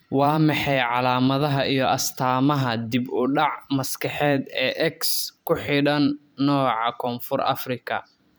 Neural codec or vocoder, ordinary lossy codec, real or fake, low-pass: vocoder, 44.1 kHz, 128 mel bands every 512 samples, BigVGAN v2; none; fake; none